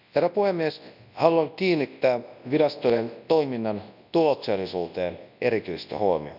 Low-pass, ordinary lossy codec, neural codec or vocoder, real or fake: 5.4 kHz; none; codec, 24 kHz, 0.9 kbps, WavTokenizer, large speech release; fake